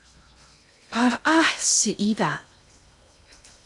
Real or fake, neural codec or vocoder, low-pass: fake; codec, 16 kHz in and 24 kHz out, 0.6 kbps, FocalCodec, streaming, 2048 codes; 10.8 kHz